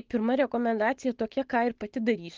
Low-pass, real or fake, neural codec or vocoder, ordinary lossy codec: 7.2 kHz; real; none; Opus, 16 kbps